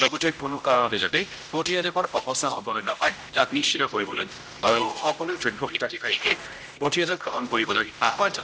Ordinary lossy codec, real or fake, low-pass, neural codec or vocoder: none; fake; none; codec, 16 kHz, 0.5 kbps, X-Codec, HuBERT features, trained on general audio